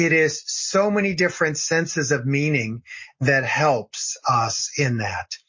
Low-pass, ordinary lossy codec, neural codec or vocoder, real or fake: 7.2 kHz; MP3, 32 kbps; none; real